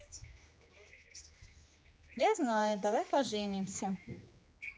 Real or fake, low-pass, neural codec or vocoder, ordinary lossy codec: fake; none; codec, 16 kHz, 2 kbps, X-Codec, HuBERT features, trained on general audio; none